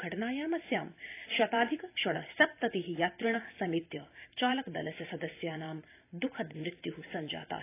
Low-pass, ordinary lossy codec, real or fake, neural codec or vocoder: 3.6 kHz; AAC, 24 kbps; fake; vocoder, 44.1 kHz, 128 mel bands every 256 samples, BigVGAN v2